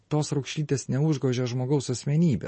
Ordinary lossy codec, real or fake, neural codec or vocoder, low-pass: MP3, 32 kbps; real; none; 9.9 kHz